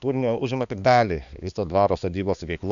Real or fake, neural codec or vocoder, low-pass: fake; codec, 16 kHz, 2 kbps, X-Codec, HuBERT features, trained on balanced general audio; 7.2 kHz